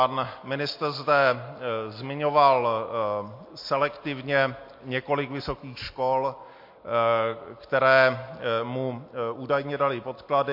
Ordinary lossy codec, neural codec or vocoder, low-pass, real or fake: MP3, 32 kbps; none; 5.4 kHz; real